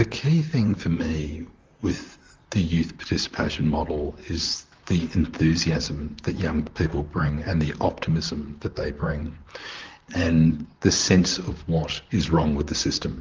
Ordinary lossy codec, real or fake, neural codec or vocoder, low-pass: Opus, 32 kbps; fake; vocoder, 44.1 kHz, 128 mel bands, Pupu-Vocoder; 7.2 kHz